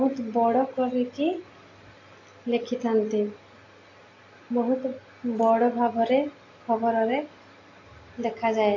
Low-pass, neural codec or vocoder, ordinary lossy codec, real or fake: 7.2 kHz; none; AAC, 32 kbps; real